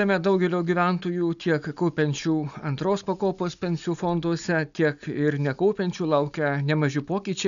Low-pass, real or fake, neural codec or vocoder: 7.2 kHz; real; none